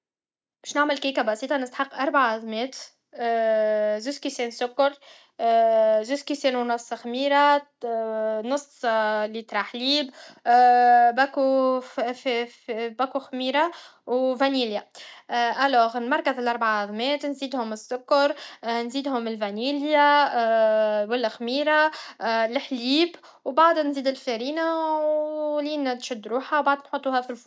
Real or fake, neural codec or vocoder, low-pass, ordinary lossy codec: real; none; none; none